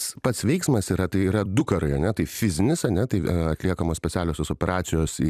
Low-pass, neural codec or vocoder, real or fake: 14.4 kHz; vocoder, 44.1 kHz, 128 mel bands every 256 samples, BigVGAN v2; fake